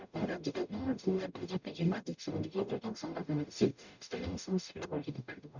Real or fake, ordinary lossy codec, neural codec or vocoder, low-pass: fake; none; codec, 44.1 kHz, 0.9 kbps, DAC; 7.2 kHz